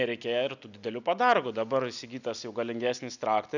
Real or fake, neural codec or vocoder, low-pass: real; none; 7.2 kHz